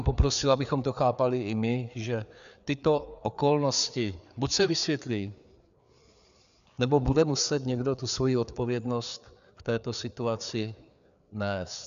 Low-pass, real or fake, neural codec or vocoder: 7.2 kHz; fake; codec, 16 kHz, 4 kbps, FreqCodec, larger model